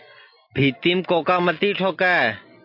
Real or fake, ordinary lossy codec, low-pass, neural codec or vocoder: real; MP3, 32 kbps; 5.4 kHz; none